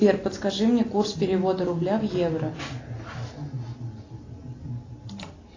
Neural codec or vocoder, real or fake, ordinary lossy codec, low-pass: none; real; AAC, 32 kbps; 7.2 kHz